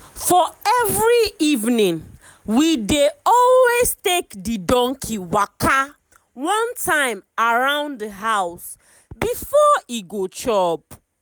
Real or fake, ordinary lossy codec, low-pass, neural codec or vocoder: real; none; none; none